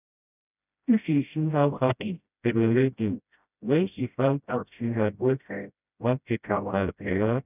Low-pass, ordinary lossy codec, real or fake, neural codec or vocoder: 3.6 kHz; none; fake; codec, 16 kHz, 0.5 kbps, FreqCodec, smaller model